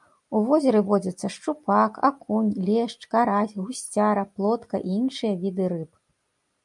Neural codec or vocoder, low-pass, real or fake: none; 10.8 kHz; real